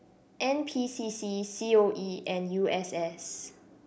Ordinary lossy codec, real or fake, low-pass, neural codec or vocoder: none; real; none; none